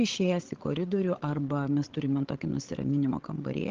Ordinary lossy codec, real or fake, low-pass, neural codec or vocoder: Opus, 16 kbps; fake; 7.2 kHz; codec, 16 kHz, 8 kbps, FreqCodec, larger model